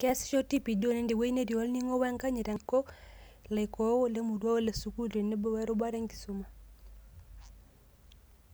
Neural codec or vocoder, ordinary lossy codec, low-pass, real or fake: none; none; none; real